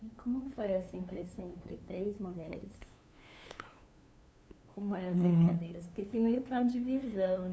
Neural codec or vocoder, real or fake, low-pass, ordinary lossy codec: codec, 16 kHz, 2 kbps, FunCodec, trained on LibriTTS, 25 frames a second; fake; none; none